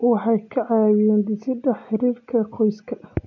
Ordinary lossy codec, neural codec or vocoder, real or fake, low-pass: none; none; real; 7.2 kHz